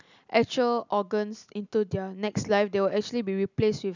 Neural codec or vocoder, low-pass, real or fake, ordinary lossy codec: none; 7.2 kHz; real; none